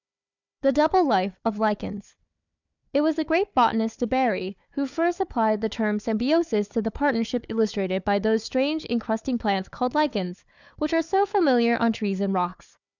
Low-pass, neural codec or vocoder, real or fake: 7.2 kHz; codec, 16 kHz, 4 kbps, FunCodec, trained on Chinese and English, 50 frames a second; fake